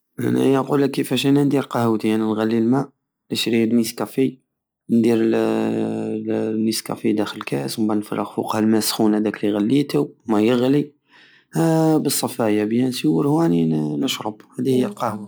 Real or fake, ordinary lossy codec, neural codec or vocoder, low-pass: real; none; none; none